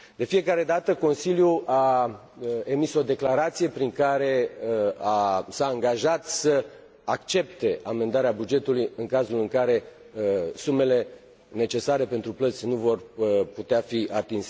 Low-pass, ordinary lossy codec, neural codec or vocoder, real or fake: none; none; none; real